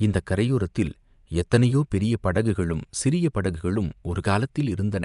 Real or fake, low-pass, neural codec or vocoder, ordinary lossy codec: fake; 10.8 kHz; vocoder, 24 kHz, 100 mel bands, Vocos; none